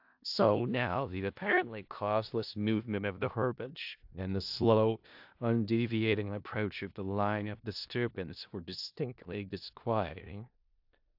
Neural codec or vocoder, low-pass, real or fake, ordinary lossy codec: codec, 16 kHz in and 24 kHz out, 0.4 kbps, LongCat-Audio-Codec, four codebook decoder; 5.4 kHz; fake; AAC, 48 kbps